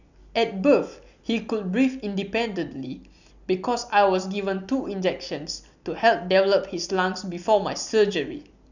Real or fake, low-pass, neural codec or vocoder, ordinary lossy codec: real; 7.2 kHz; none; none